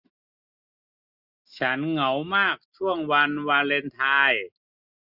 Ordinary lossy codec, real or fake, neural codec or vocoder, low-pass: Opus, 64 kbps; real; none; 5.4 kHz